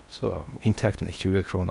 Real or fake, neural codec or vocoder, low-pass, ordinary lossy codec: fake; codec, 16 kHz in and 24 kHz out, 0.8 kbps, FocalCodec, streaming, 65536 codes; 10.8 kHz; none